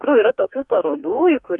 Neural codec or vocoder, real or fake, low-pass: codec, 44.1 kHz, 2.6 kbps, DAC; fake; 10.8 kHz